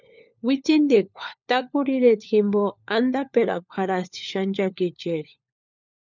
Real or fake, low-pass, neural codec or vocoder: fake; 7.2 kHz; codec, 16 kHz, 4 kbps, FunCodec, trained on LibriTTS, 50 frames a second